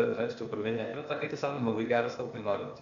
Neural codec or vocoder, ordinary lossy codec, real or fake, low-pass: codec, 16 kHz, 0.8 kbps, ZipCodec; Opus, 64 kbps; fake; 7.2 kHz